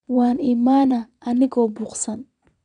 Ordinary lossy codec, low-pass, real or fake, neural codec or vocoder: none; 9.9 kHz; fake; vocoder, 22.05 kHz, 80 mel bands, WaveNeXt